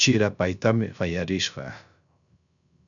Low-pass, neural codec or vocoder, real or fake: 7.2 kHz; codec, 16 kHz, 0.3 kbps, FocalCodec; fake